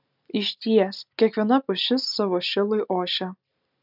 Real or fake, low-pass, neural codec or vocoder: real; 5.4 kHz; none